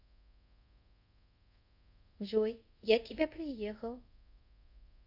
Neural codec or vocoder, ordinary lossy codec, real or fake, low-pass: codec, 24 kHz, 0.5 kbps, DualCodec; none; fake; 5.4 kHz